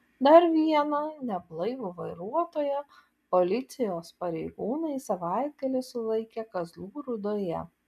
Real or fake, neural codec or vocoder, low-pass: real; none; 14.4 kHz